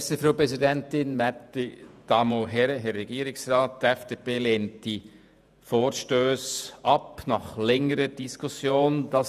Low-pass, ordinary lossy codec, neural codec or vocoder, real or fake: 14.4 kHz; none; vocoder, 48 kHz, 128 mel bands, Vocos; fake